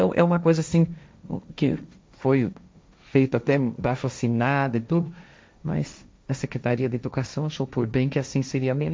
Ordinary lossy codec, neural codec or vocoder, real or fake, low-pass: none; codec, 16 kHz, 1.1 kbps, Voila-Tokenizer; fake; none